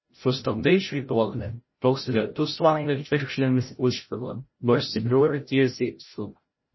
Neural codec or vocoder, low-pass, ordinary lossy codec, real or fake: codec, 16 kHz, 0.5 kbps, FreqCodec, larger model; 7.2 kHz; MP3, 24 kbps; fake